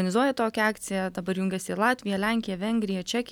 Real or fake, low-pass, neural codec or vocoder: real; 19.8 kHz; none